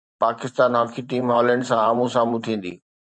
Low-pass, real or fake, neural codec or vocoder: 9.9 kHz; fake; vocoder, 44.1 kHz, 128 mel bands every 512 samples, BigVGAN v2